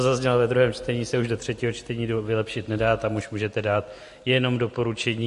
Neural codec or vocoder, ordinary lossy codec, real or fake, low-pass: none; MP3, 48 kbps; real; 14.4 kHz